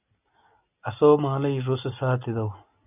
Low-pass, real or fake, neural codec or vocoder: 3.6 kHz; real; none